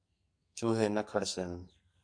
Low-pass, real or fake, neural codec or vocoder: 9.9 kHz; fake; codec, 44.1 kHz, 2.6 kbps, SNAC